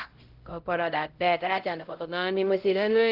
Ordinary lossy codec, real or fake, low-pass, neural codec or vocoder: Opus, 16 kbps; fake; 5.4 kHz; codec, 16 kHz, 0.5 kbps, X-Codec, HuBERT features, trained on LibriSpeech